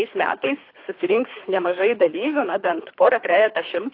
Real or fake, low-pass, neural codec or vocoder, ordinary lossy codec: fake; 5.4 kHz; codec, 24 kHz, 3 kbps, HILCodec; AAC, 32 kbps